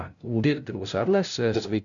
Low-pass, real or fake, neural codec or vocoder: 7.2 kHz; fake; codec, 16 kHz, 0.5 kbps, FunCodec, trained on LibriTTS, 25 frames a second